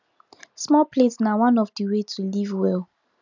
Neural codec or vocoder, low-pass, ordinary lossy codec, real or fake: none; 7.2 kHz; none; real